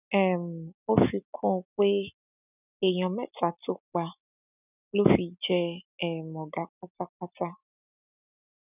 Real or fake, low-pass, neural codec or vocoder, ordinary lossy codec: real; 3.6 kHz; none; none